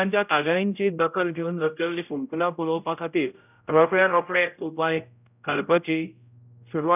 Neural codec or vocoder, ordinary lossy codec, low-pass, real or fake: codec, 16 kHz, 0.5 kbps, X-Codec, HuBERT features, trained on general audio; none; 3.6 kHz; fake